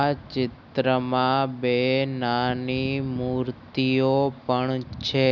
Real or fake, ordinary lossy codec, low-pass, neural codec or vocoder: real; none; 7.2 kHz; none